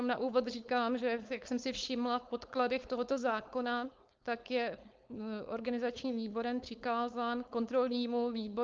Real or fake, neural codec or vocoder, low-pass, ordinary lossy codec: fake; codec, 16 kHz, 4.8 kbps, FACodec; 7.2 kHz; Opus, 24 kbps